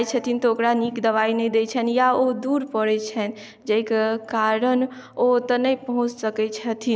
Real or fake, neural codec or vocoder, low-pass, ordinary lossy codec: real; none; none; none